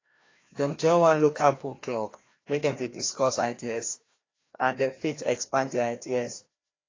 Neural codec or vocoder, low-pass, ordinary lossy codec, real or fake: codec, 16 kHz, 1 kbps, FreqCodec, larger model; 7.2 kHz; AAC, 32 kbps; fake